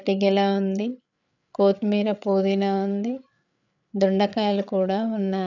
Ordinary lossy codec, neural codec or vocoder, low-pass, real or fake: none; codec, 16 kHz, 8 kbps, FreqCodec, larger model; 7.2 kHz; fake